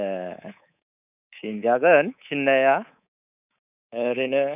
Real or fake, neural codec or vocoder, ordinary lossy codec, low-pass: fake; codec, 24 kHz, 3.1 kbps, DualCodec; none; 3.6 kHz